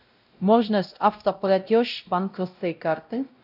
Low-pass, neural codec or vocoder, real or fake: 5.4 kHz; codec, 16 kHz, 0.5 kbps, X-Codec, WavLM features, trained on Multilingual LibriSpeech; fake